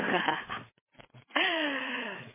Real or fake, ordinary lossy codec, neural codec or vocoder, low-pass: fake; MP3, 16 kbps; codec, 16 kHz, 4 kbps, FreqCodec, larger model; 3.6 kHz